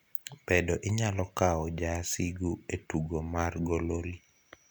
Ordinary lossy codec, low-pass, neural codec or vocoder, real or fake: none; none; none; real